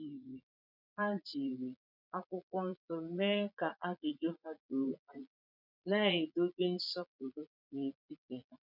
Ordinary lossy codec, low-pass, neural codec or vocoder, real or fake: none; 5.4 kHz; vocoder, 22.05 kHz, 80 mel bands, Vocos; fake